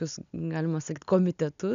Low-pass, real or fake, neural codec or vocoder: 7.2 kHz; real; none